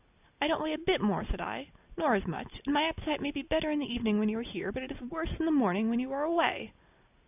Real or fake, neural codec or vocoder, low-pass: real; none; 3.6 kHz